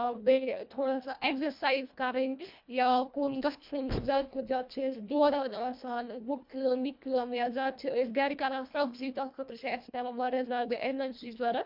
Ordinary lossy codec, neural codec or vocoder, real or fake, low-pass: MP3, 48 kbps; codec, 24 kHz, 1.5 kbps, HILCodec; fake; 5.4 kHz